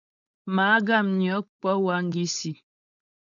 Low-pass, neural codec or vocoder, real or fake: 7.2 kHz; codec, 16 kHz, 4.8 kbps, FACodec; fake